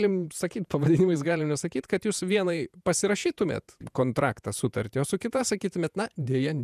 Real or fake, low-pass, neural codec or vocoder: fake; 14.4 kHz; vocoder, 48 kHz, 128 mel bands, Vocos